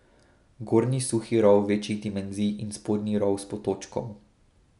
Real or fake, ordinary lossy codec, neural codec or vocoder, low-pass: real; none; none; 10.8 kHz